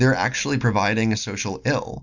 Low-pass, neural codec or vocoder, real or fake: 7.2 kHz; none; real